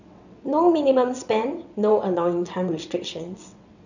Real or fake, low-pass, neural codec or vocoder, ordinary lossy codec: fake; 7.2 kHz; vocoder, 22.05 kHz, 80 mel bands, WaveNeXt; none